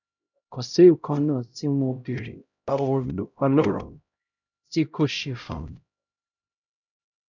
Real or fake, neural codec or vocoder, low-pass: fake; codec, 16 kHz, 0.5 kbps, X-Codec, HuBERT features, trained on LibriSpeech; 7.2 kHz